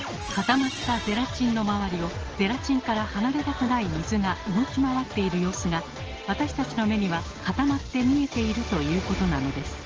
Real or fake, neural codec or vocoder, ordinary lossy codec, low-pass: real; none; Opus, 16 kbps; 7.2 kHz